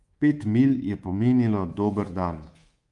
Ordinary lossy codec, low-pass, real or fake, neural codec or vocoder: Opus, 32 kbps; 10.8 kHz; fake; codec, 24 kHz, 3.1 kbps, DualCodec